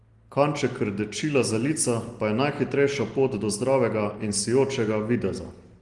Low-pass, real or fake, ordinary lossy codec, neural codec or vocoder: 10.8 kHz; real; Opus, 24 kbps; none